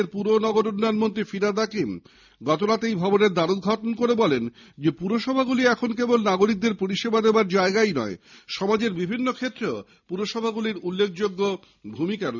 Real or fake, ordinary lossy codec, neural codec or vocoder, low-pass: real; none; none; 7.2 kHz